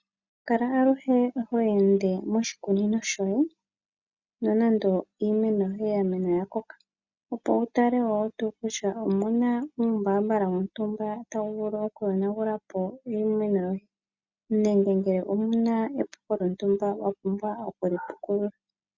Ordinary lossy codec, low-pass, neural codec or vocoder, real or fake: Opus, 64 kbps; 7.2 kHz; none; real